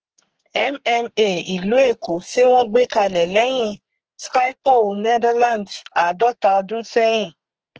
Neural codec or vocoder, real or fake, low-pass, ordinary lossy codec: codec, 32 kHz, 1.9 kbps, SNAC; fake; 7.2 kHz; Opus, 16 kbps